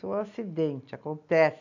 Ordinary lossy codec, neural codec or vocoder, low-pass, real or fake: none; none; 7.2 kHz; real